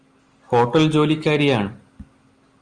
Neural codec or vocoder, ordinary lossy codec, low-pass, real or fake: none; Opus, 32 kbps; 9.9 kHz; real